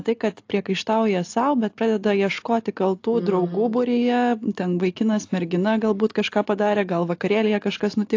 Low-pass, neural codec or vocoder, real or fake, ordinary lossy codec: 7.2 kHz; none; real; AAC, 48 kbps